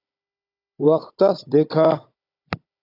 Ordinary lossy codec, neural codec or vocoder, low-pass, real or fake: AAC, 32 kbps; codec, 16 kHz, 16 kbps, FunCodec, trained on Chinese and English, 50 frames a second; 5.4 kHz; fake